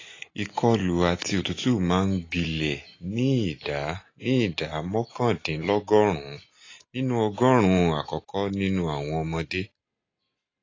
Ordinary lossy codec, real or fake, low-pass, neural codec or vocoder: AAC, 32 kbps; real; 7.2 kHz; none